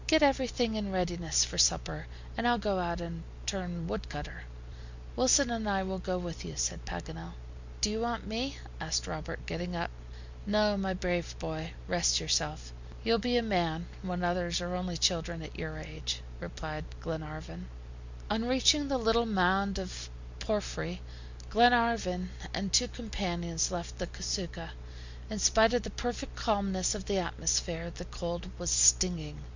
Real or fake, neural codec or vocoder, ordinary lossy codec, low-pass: real; none; Opus, 64 kbps; 7.2 kHz